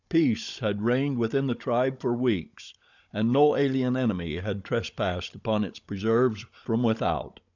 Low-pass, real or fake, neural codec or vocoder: 7.2 kHz; fake; codec, 16 kHz, 16 kbps, FunCodec, trained on Chinese and English, 50 frames a second